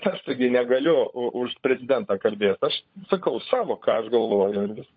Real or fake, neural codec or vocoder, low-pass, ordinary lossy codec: fake; codec, 16 kHz, 16 kbps, FunCodec, trained on LibriTTS, 50 frames a second; 7.2 kHz; MP3, 32 kbps